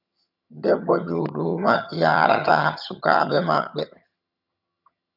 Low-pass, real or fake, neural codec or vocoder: 5.4 kHz; fake; vocoder, 22.05 kHz, 80 mel bands, HiFi-GAN